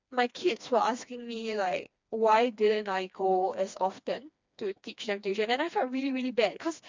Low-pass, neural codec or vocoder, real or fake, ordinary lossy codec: 7.2 kHz; codec, 16 kHz, 2 kbps, FreqCodec, smaller model; fake; MP3, 64 kbps